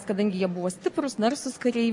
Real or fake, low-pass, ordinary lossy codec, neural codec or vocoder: real; 10.8 kHz; MP3, 48 kbps; none